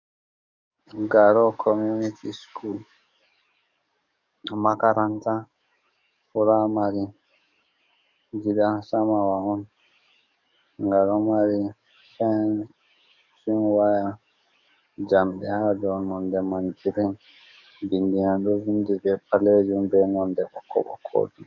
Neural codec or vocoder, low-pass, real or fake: codec, 44.1 kHz, 7.8 kbps, DAC; 7.2 kHz; fake